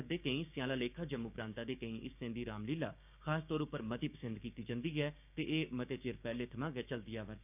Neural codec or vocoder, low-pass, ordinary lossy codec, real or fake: codec, 16 kHz, 6 kbps, DAC; 3.6 kHz; none; fake